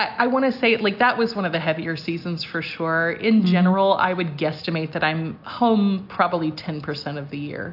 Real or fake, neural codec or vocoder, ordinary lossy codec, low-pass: real; none; AAC, 48 kbps; 5.4 kHz